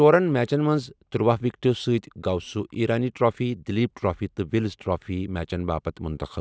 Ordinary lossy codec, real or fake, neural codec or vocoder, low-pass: none; real; none; none